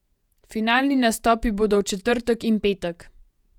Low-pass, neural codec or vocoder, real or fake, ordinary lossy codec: 19.8 kHz; vocoder, 48 kHz, 128 mel bands, Vocos; fake; none